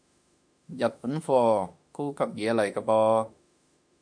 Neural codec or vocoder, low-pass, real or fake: autoencoder, 48 kHz, 32 numbers a frame, DAC-VAE, trained on Japanese speech; 9.9 kHz; fake